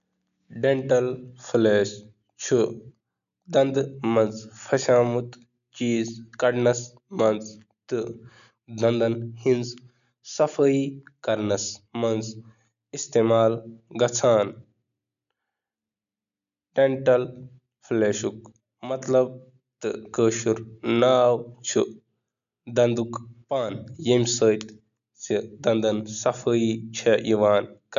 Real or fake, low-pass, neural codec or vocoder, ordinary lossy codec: real; 7.2 kHz; none; none